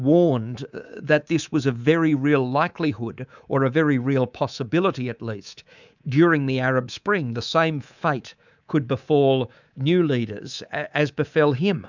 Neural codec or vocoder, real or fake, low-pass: codec, 24 kHz, 3.1 kbps, DualCodec; fake; 7.2 kHz